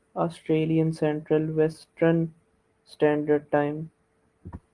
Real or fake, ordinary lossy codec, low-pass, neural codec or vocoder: real; Opus, 24 kbps; 10.8 kHz; none